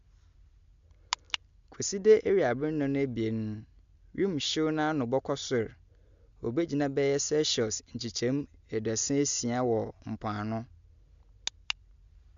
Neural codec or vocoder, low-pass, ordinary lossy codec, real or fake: none; 7.2 kHz; MP3, 64 kbps; real